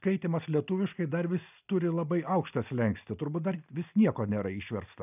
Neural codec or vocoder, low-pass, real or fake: none; 3.6 kHz; real